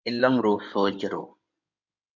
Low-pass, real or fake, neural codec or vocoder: 7.2 kHz; fake; codec, 16 kHz in and 24 kHz out, 2.2 kbps, FireRedTTS-2 codec